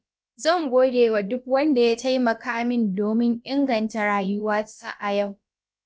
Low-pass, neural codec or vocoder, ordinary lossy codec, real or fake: none; codec, 16 kHz, about 1 kbps, DyCAST, with the encoder's durations; none; fake